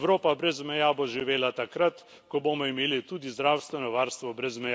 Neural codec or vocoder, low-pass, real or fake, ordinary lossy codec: none; none; real; none